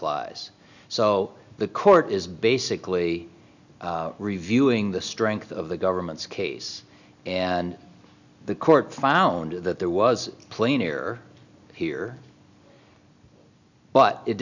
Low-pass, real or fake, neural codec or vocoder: 7.2 kHz; real; none